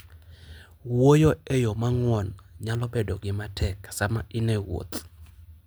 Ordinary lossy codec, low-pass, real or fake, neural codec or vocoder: none; none; real; none